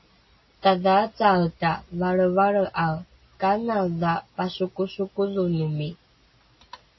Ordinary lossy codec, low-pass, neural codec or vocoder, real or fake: MP3, 24 kbps; 7.2 kHz; none; real